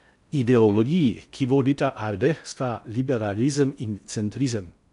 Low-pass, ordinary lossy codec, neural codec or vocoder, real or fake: 10.8 kHz; none; codec, 16 kHz in and 24 kHz out, 0.6 kbps, FocalCodec, streaming, 4096 codes; fake